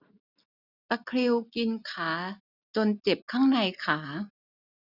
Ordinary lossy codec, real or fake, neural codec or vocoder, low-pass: none; real; none; 5.4 kHz